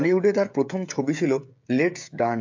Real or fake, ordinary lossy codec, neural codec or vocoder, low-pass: fake; MP3, 48 kbps; codec, 16 kHz, 16 kbps, FreqCodec, smaller model; 7.2 kHz